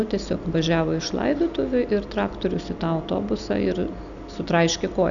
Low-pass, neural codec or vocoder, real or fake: 7.2 kHz; none; real